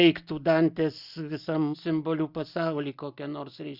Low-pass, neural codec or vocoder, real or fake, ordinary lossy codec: 5.4 kHz; none; real; Opus, 64 kbps